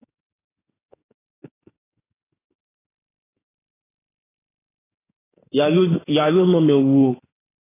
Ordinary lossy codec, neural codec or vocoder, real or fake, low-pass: AAC, 16 kbps; autoencoder, 48 kHz, 32 numbers a frame, DAC-VAE, trained on Japanese speech; fake; 3.6 kHz